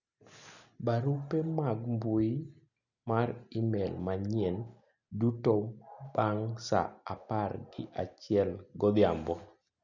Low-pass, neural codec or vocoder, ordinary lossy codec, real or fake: 7.2 kHz; none; none; real